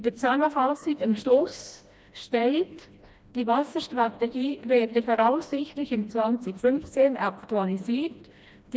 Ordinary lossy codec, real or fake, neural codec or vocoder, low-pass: none; fake; codec, 16 kHz, 1 kbps, FreqCodec, smaller model; none